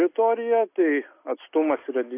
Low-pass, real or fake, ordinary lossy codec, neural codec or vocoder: 3.6 kHz; real; AAC, 24 kbps; none